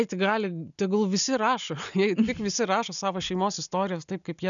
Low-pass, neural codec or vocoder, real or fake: 7.2 kHz; none; real